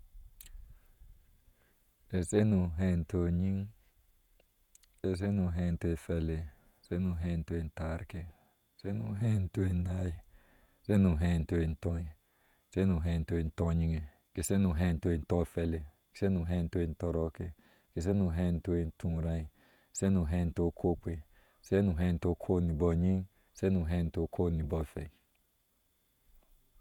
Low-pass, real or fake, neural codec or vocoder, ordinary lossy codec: 19.8 kHz; fake; vocoder, 48 kHz, 128 mel bands, Vocos; none